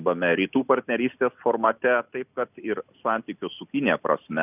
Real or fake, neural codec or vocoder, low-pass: real; none; 3.6 kHz